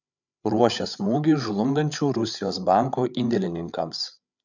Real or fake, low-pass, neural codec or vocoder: fake; 7.2 kHz; codec, 16 kHz, 8 kbps, FreqCodec, larger model